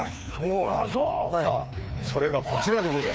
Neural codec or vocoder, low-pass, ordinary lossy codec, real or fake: codec, 16 kHz, 2 kbps, FreqCodec, larger model; none; none; fake